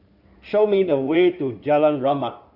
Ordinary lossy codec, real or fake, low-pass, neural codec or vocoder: none; fake; 5.4 kHz; codec, 16 kHz in and 24 kHz out, 2.2 kbps, FireRedTTS-2 codec